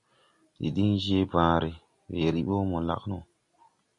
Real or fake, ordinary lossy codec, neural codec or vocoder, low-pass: real; MP3, 64 kbps; none; 10.8 kHz